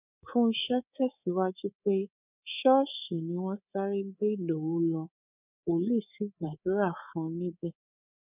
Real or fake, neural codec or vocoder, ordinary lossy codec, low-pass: fake; codec, 24 kHz, 3.1 kbps, DualCodec; none; 3.6 kHz